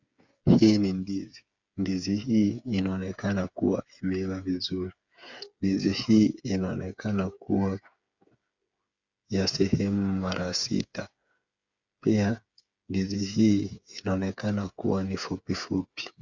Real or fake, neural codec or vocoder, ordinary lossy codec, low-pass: fake; codec, 16 kHz, 8 kbps, FreqCodec, smaller model; Opus, 64 kbps; 7.2 kHz